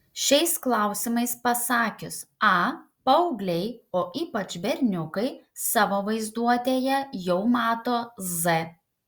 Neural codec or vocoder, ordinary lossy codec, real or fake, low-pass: none; Opus, 64 kbps; real; 19.8 kHz